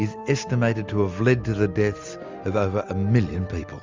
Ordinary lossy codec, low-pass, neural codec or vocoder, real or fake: Opus, 32 kbps; 7.2 kHz; none; real